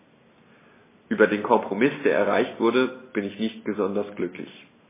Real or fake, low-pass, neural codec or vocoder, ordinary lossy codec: real; 3.6 kHz; none; MP3, 16 kbps